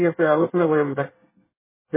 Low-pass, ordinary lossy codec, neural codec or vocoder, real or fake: 3.6 kHz; MP3, 16 kbps; codec, 24 kHz, 1 kbps, SNAC; fake